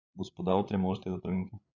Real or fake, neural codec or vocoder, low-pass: fake; codec, 16 kHz, 8 kbps, FreqCodec, larger model; 7.2 kHz